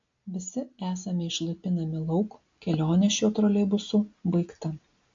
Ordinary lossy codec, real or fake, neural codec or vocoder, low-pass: AAC, 48 kbps; real; none; 7.2 kHz